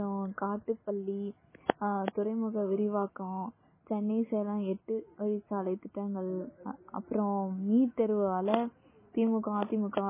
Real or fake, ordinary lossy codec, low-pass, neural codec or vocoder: real; MP3, 16 kbps; 3.6 kHz; none